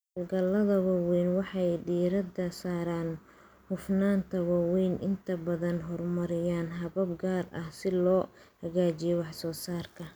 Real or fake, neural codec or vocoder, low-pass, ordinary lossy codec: real; none; none; none